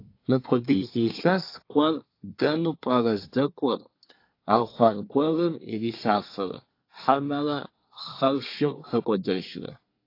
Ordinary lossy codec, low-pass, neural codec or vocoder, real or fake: AAC, 32 kbps; 5.4 kHz; codec, 24 kHz, 1 kbps, SNAC; fake